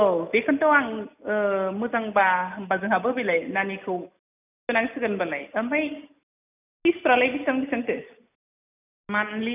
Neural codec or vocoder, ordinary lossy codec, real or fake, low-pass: none; none; real; 3.6 kHz